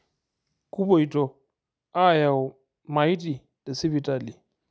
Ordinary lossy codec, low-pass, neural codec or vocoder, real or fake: none; none; none; real